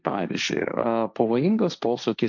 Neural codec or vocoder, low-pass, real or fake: codec, 16 kHz, 1.1 kbps, Voila-Tokenizer; 7.2 kHz; fake